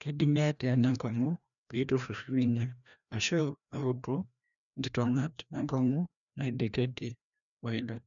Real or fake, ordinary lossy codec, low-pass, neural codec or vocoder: fake; none; 7.2 kHz; codec, 16 kHz, 1 kbps, FreqCodec, larger model